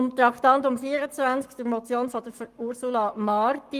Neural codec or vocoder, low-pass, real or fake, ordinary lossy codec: codec, 44.1 kHz, 7.8 kbps, Pupu-Codec; 14.4 kHz; fake; Opus, 32 kbps